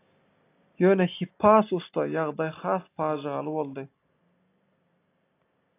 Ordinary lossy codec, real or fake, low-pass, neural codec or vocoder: AAC, 24 kbps; real; 3.6 kHz; none